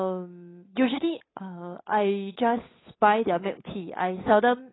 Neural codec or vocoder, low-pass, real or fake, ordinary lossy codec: codec, 16 kHz, 8 kbps, FunCodec, trained on Chinese and English, 25 frames a second; 7.2 kHz; fake; AAC, 16 kbps